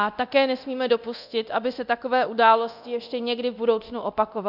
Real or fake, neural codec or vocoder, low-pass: fake; codec, 24 kHz, 0.9 kbps, DualCodec; 5.4 kHz